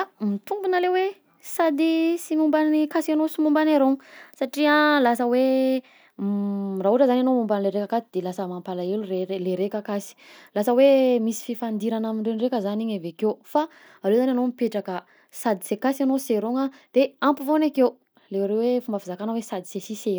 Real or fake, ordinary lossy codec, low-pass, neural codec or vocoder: real; none; none; none